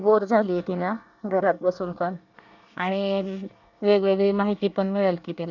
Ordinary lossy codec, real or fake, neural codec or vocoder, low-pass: none; fake; codec, 24 kHz, 1 kbps, SNAC; 7.2 kHz